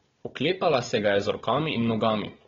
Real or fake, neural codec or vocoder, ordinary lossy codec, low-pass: fake; codec, 16 kHz, 4 kbps, FunCodec, trained on Chinese and English, 50 frames a second; AAC, 24 kbps; 7.2 kHz